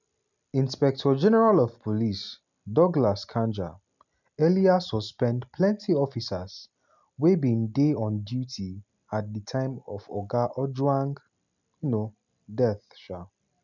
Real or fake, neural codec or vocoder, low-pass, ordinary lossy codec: real; none; 7.2 kHz; none